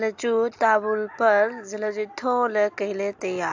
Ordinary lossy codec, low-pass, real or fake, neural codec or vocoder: AAC, 48 kbps; 7.2 kHz; real; none